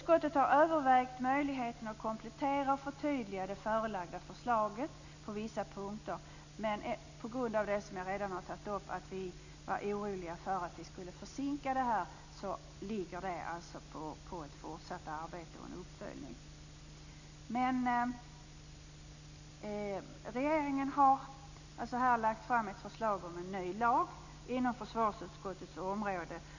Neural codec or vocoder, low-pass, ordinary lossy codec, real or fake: none; 7.2 kHz; none; real